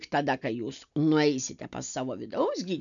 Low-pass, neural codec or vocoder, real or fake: 7.2 kHz; none; real